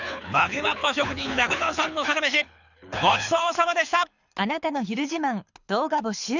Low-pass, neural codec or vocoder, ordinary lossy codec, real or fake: 7.2 kHz; codec, 24 kHz, 6 kbps, HILCodec; none; fake